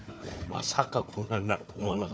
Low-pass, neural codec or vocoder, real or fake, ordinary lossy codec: none; codec, 16 kHz, 4 kbps, FunCodec, trained on Chinese and English, 50 frames a second; fake; none